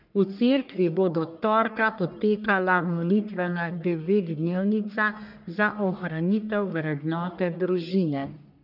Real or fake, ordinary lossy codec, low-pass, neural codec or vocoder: fake; none; 5.4 kHz; codec, 44.1 kHz, 1.7 kbps, Pupu-Codec